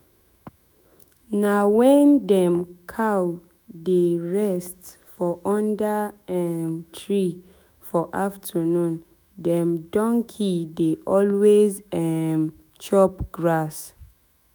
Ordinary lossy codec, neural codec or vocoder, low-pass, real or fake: none; autoencoder, 48 kHz, 128 numbers a frame, DAC-VAE, trained on Japanese speech; none; fake